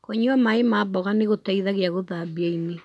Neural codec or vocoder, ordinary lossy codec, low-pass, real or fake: none; none; none; real